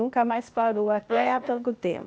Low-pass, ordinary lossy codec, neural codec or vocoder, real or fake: none; none; codec, 16 kHz, 0.8 kbps, ZipCodec; fake